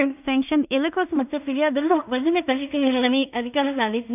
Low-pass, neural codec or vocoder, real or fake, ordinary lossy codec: 3.6 kHz; codec, 16 kHz in and 24 kHz out, 0.4 kbps, LongCat-Audio-Codec, two codebook decoder; fake; none